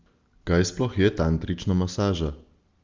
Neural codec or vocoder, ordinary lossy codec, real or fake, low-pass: none; Opus, 32 kbps; real; 7.2 kHz